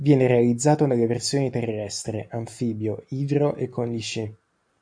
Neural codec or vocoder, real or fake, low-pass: none; real; 9.9 kHz